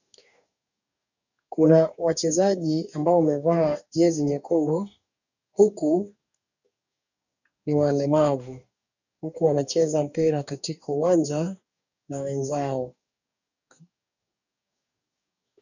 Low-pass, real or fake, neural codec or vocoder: 7.2 kHz; fake; codec, 44.1 kHz, 2.6 kbps, DAC